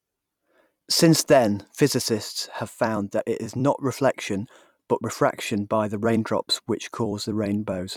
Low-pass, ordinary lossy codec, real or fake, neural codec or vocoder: 19.8 kHz; none; fake; vocoder, 44.1 kHz, 128 mel bands every 256 samples, BigVGAN v2